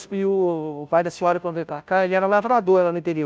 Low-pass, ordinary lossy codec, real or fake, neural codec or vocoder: none; none; fake; codec, 16 kHz, 0.5 kbps, FunCodec, trained on Chinese and English, 25 frames a second